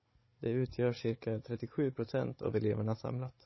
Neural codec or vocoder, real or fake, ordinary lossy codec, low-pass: codec, 16 kHz, 16 kbps, FunCodec, trained on Chinese and English, 50 frames a second; fake; MP3, 24 kbps; 7.2 kHz